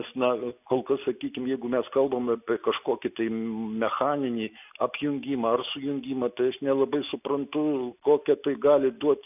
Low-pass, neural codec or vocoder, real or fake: 3.6 kHz; none; real